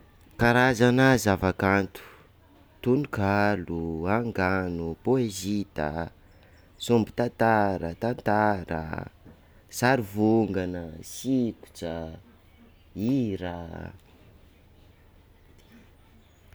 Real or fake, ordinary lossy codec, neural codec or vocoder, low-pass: real; none; none; none